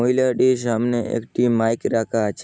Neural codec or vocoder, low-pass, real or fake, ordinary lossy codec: none; none; real; none